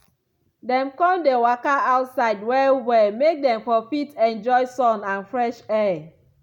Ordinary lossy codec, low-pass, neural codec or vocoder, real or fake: none; 19.8 kHz; none; real